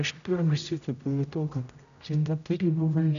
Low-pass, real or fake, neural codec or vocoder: 7.2 kHz; fake; codec, 16 kHz, 0.5 kbps, X-Codec, HuBERT features, trained on general audio